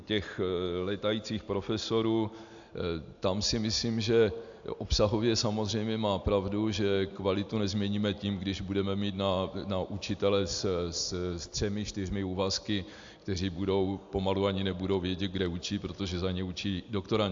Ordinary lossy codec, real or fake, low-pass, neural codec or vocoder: AAC, 64 kbps; real; 7.2 kHz; none